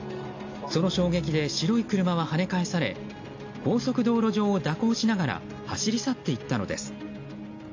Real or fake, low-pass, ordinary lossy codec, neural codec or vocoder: real; 7.2 kHz; AAC, 48 kbps; none